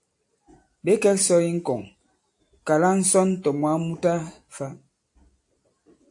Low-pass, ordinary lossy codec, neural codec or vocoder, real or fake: 10.8 kHz; AAC, 64 kbps; none; real